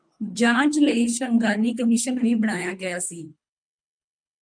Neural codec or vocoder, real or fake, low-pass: codec, 24 kHz, 3 kbps, HILCodec; fake; 9.9 kHz